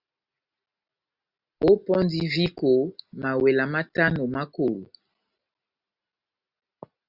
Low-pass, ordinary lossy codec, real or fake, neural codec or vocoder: 5.4 kHz; Opus, 64 kbps; real; none